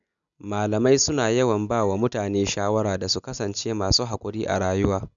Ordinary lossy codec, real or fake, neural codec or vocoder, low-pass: none; real; none; 7.2 kHz